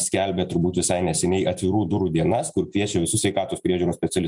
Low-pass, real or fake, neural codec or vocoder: 10.8 kHz; real; none